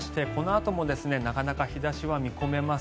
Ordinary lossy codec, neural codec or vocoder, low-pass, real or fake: none; none; none; real